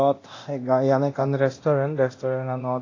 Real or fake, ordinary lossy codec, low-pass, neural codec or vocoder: fake; AAC, 32 kbps; 7.2 kHz; codec, 24 kHz, 0.9 kbps, DualCodec